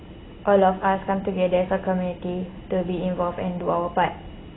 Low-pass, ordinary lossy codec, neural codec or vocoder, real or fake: 7.2 kHz; AAC, 16 kbps; none; real